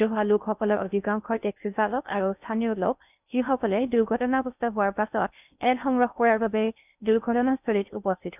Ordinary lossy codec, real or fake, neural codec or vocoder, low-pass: none; fake; codec, 16 kHz in and 24 kHz out, 0.6 kbps, FocalCodec, streaming, 4096 codes; 3.6 kHz